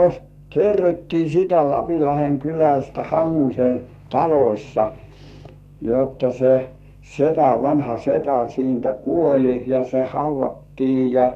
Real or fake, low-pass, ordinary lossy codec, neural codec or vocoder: fake; 14.4 kHz; none; codec, 32 kHz, 1.9 kbps, SNAC